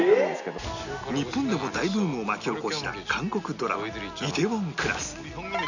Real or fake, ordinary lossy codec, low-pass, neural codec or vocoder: real; none; 7.2 kHz; none